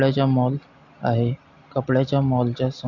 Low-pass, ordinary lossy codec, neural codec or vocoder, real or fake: 7.2 kHz; AAC, 48 kbps; none; real